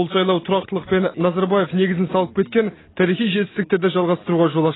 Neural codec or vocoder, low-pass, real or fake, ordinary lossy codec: none; 7.2 kHz; real; AAC, 16 kbps